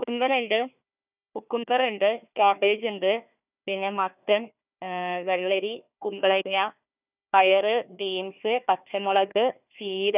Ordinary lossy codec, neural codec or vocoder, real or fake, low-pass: none; codec, 16 kHz, 1 kbps, FunCodec, trained on Chinese and English, 50 frames a second; fake; 3.6 kHz